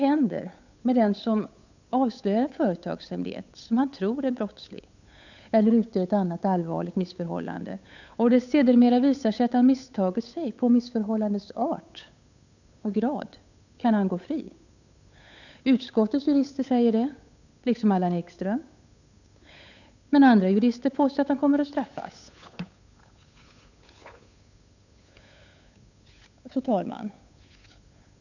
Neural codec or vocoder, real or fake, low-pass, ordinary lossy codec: codec, 16 kHz, 8 kbps, FunCodec, trained on Chinese and English, 25 frames a second; fake; 7.2 kHz; none